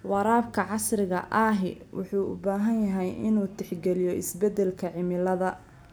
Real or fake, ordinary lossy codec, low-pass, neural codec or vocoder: real; none; none; none